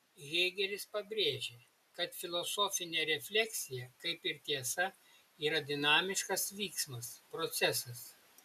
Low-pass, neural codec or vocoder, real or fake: 14.4 kHz; none; real